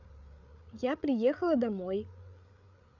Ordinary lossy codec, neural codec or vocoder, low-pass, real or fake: none; codec, 16 kHz, 8 kbps, FreqCodec, larger model; 7.2 kHz; fake